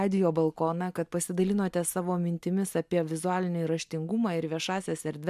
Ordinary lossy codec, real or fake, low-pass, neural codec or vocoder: MP3, 96 kbps; real; 14.4 kHz; none